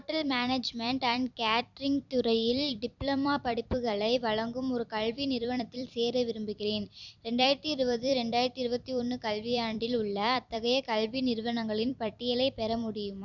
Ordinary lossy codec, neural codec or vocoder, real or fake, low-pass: none; vocoder, 44.1 kHz, 128 mel bands every 256 samples, BigVGAN v2; fake; 7.2 kHz